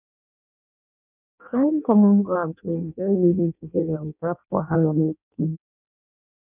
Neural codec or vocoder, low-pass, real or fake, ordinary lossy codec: codec, 16 kHz in and 24 kHz out, 0.6 kbps, FireRedTTS-2 codec; 3.6 kHz; fake; none